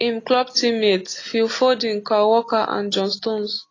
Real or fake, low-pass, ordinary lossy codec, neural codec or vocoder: real; 7.2 kHz; AAC, 32 kbps; none